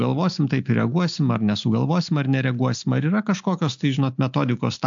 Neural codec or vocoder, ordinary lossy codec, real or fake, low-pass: none; AAC, 64 kbps; real; 7.2 kHz